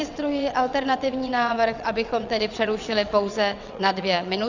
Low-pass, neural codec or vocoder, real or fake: 7.2 kHz; vocoder, 22.05 kHz, 80 mel bands, WaveNeXt; fake